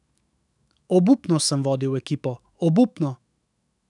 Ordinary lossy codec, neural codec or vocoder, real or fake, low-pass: none; autoencoder, 48 kHz, 128 numbers a frame, DAC-VAE, trained on Japanese speech; fake; 10.8 kHz